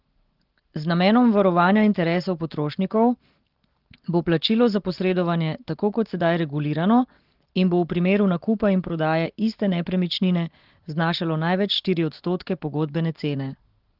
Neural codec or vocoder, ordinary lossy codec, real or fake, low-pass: none; Opus, 16 kbps; real; 5.4 kHz